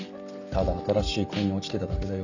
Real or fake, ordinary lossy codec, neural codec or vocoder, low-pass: real; none; none; 7.2 kHz